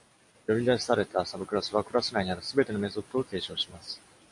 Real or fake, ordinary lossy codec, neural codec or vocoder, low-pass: real; AAC, 64 kbps; none; 10.8 kHz